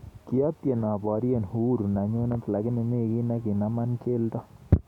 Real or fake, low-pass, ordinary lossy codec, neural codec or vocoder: real; 19.8 kHz; none; none